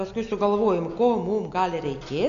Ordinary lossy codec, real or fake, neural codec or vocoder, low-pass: AAC, 64 kbps; real; none; 7.2 kHz